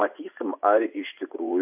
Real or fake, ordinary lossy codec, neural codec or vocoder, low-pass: fake; AAC, 32 kbps; vocoder, 24 kHz, 100 mel bands, Vocos; 3.6 kHz